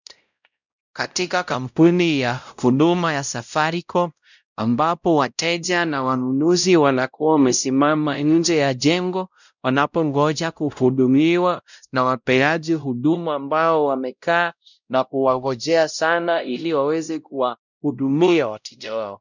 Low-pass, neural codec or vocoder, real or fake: 7.2 kHz; codec, 16 kHz, 0.5 kbps, X-Codec, WavLM features, trained on Multilingual LibriSpeech; fake